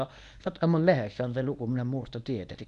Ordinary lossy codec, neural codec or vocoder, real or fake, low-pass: none; codec, 24 kHz, 0.9 kbps, WavTokenizer, medium speech release version 1; fake; 10.8 kHz